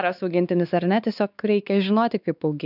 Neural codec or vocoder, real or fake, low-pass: codec, 16 kHz, 4 kbps, X-Codec, WavLM features, trained on Multilingual LibriSpeech; fake; 5.4 kHz